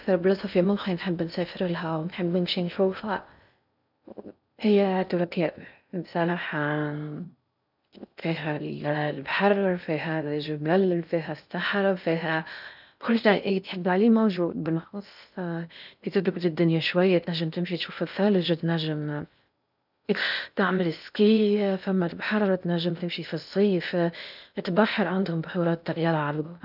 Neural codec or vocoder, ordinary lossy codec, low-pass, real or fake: codec, 16 kHz in and 24 kHz out, 0.6 kbps, FocalCodec, streaming, 2048 codes; none; 5.4 kHz; fake